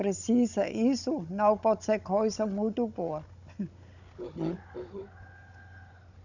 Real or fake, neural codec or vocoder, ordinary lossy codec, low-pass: fake; codec, 16 kHz, 16 kbps, FunCodec, trained on Chinese and English, 50 frames a second; none; 7.2 kHz